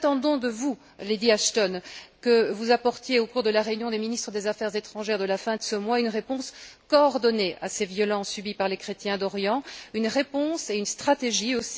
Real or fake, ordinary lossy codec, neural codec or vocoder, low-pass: real; none; none; none